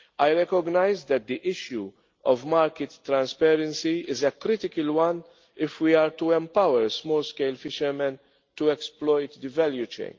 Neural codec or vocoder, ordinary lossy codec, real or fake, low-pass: none; Opus, 32 kbps; real; 7.2 kHz